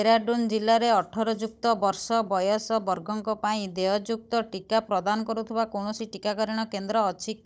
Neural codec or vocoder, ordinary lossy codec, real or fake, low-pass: codec, 16 kHz, 16 kbps, FunCodec, trained on Chinese and English, 50 frames a second; none; fake; none